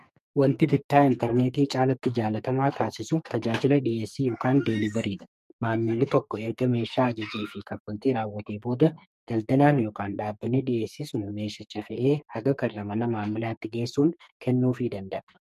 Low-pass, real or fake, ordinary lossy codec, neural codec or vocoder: 14.4 kHz; fake; MP3, 64 kbps; codec, 44.1 kHz, 2.6 kbps, SNAC